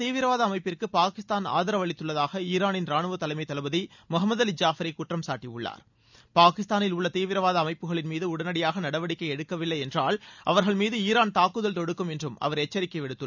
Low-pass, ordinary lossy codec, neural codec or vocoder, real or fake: 7.2 kHz; none; none; real